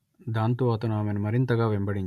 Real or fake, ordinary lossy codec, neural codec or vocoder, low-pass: real; none; none; 14.4 kHz